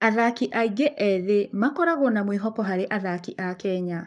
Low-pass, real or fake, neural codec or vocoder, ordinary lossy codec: 10.8 kHz; fake; codec, 44.1 kHz, 7.8 kbps, Pupu-Codec; none